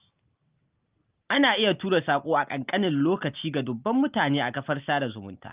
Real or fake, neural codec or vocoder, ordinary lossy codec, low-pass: real; none; Opus, 32 kbps; 3.6 kHz